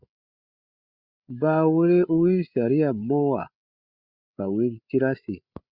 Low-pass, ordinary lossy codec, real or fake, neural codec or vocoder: 5.4 kHz; Opus, 64 kbps; fake; codec, 16 kHz, 8 kbps, FreqCodec, larger model